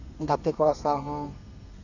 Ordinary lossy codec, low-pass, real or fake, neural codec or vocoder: none; 7.2 kHz; fake; codec, 32 kHz, 1.9 kbps, SNAC